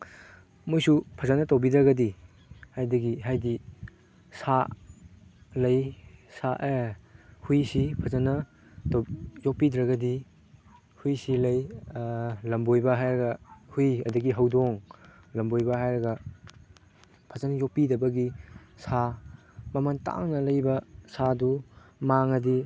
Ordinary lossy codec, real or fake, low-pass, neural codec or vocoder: none; real; none; none